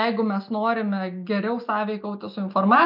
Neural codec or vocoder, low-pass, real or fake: none; 5.4 kHz; real